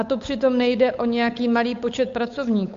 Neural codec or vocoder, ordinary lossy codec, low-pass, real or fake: codec, 16 kHz, 8 kbps, FunCodec, trained on Chinese and English, 25 frames a second; AAC, 64 kbps; 7.2 kHz; fake